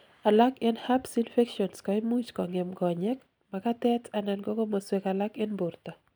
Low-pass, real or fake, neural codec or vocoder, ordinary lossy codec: none; real; none; none